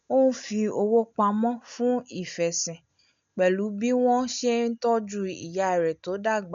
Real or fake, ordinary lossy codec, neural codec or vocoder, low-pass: real; MP3, 64 kbps; none; 7.2 kHz